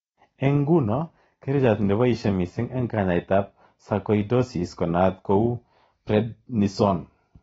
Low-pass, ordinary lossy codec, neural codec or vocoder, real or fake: 19.8 kHz; AAC, 24 kbps; none; real